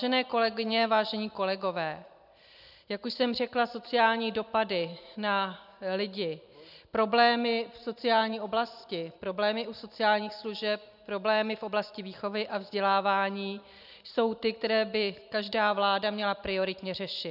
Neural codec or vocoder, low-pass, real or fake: none; 5.4 kHz; real